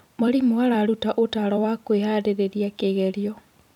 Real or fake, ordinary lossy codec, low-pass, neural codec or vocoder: fake; none; 19.8 kHz; vocoder, 44.1 kHz, 128 mel bands every 256 samples, BigVGAN v2